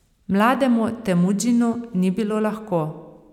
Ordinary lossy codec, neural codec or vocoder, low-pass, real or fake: none; none; 19.8 kHz; real